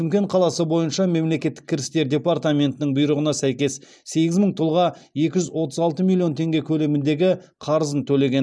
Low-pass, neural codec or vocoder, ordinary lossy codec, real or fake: none; none; none; real